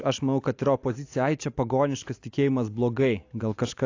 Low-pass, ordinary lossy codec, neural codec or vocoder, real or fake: 7.2 kHz; AAC, 48 kbps; none; real